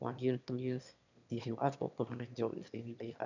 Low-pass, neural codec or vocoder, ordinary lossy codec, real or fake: 7.2 kHz; autoencoder, 22.05 kHz, a latent of 192 numbers a frame, VITS, trained on one speaker; none; fake